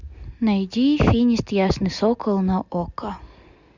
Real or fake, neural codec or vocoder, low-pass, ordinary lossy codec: real; none; 7.2 kHz; Opus, 64 kbps